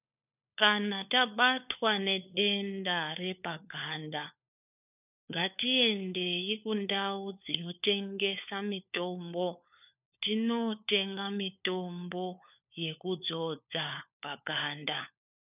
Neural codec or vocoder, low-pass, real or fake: codec, 16 kHz, 4 kbps, FunCodec, trained on LibriTTS, 50 frames a second; 3.6 kHz; fake